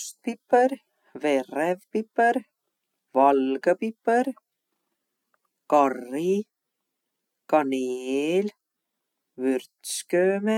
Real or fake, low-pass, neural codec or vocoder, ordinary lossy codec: real; 19.8 kHz; none; none